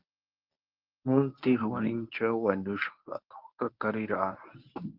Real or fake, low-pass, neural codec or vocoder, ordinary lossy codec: fake; 5.4 kHz; codec, 24 kHz, 0.9 kbps, WavTokenizer, medium speech release version 1; Opus, 24 kbps